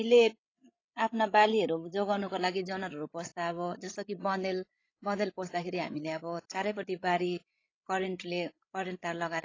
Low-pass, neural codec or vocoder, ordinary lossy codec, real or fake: 7.2 kHz; codec, 16 kHz, 16 kbps, FreqCodec, larger model; AAC, 32 kbps; fake